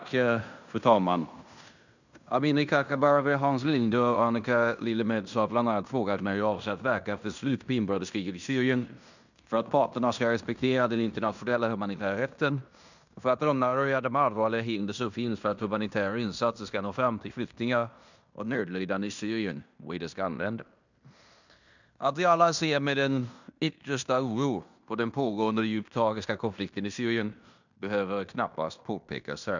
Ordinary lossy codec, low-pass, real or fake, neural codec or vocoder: none; 7.2 kHz; fake; codec, 16 kHz in and 24 kHz out, 0.9 kbps, LongCat-Audio-Codec, fine tuned four codebook decoder